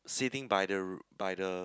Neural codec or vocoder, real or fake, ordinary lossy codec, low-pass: none; real; none; none